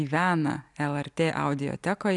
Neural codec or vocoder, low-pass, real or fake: none; 10.8 kHz; real